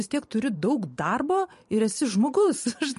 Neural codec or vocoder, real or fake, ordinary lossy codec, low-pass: vocoder, 44.1 kHz, 128 mel bands every 512 samples, BigVGAN v2; fake; MP3, 48 kbps; 14.4 kHz